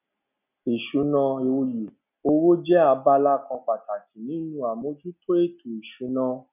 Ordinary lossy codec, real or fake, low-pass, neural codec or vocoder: none; real; 3.6 kHz; none